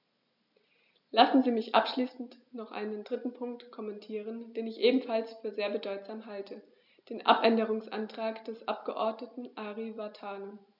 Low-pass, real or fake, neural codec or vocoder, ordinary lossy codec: 5.4 kHz; real; none; none